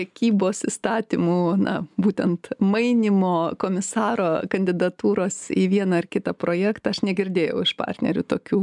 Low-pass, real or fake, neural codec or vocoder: 10.8 kHz; real; none